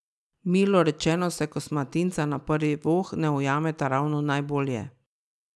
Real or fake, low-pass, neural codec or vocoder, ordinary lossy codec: real; none; none; none